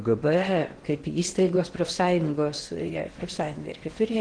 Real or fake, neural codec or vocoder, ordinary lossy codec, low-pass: fake; codec, 16 kHz in and 24 kHz out, 0.8 kbps, FocalCodec, streaming, 65536 codes; Opus, 16 kbps; 9.9 kHz